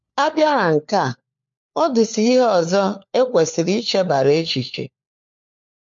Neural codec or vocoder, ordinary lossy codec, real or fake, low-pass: codec, 16 kHz, 4 kbps, FunCodec, trained on LibriTTS, 50 frames a second; MP3, 48 kbps; fake; 7.2 kHz